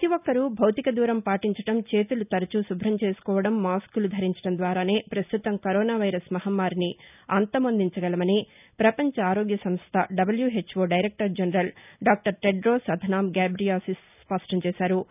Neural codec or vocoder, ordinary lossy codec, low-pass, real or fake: none; none; 3.6 kHz; real